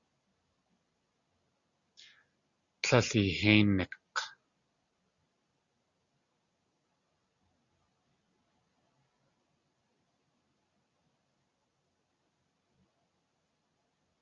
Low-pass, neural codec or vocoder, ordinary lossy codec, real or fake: 7.2 kHz; none; Opus, 64 kbps; real